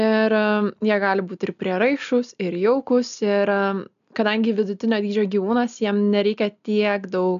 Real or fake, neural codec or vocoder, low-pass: real; none; 7.2 kHz